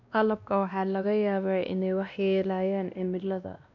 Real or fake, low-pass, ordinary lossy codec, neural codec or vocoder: fake; 7.2 kHz; none; codec, 16 kHz, 1 kbps, X-Codec, WavLM features, trained on Multilingual LibriSpeech